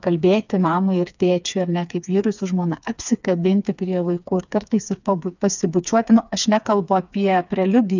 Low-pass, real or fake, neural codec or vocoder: 7.2 kHz; fake; codec, 16 kHz, 4 kbps, FreqCodec, smaller model